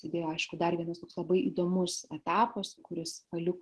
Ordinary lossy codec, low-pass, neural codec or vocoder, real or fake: Opus, 16 kbps; 10.8 kHz; none; real